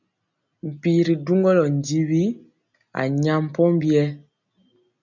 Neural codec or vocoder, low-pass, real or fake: none; 7.2 kHz; real